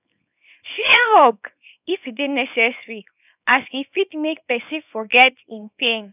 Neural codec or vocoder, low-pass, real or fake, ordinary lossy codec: codec, 24 kHz, 0.9 kbps, WavTokenizer, small release; 3.6 kHz; fake; none